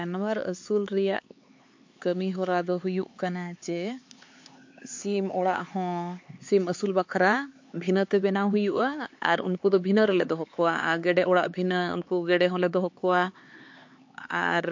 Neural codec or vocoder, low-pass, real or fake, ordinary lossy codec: codec, 16 kHz, 4 kbps, X-Codec, HuBERT features, trained on LibriSpeech; 7.2 kHz; fake; MP3, 48 kbps